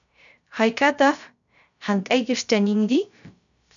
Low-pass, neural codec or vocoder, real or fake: 7.2 kHz; codec, 16 kHz, 0.3 kbps, FocalCodec; fake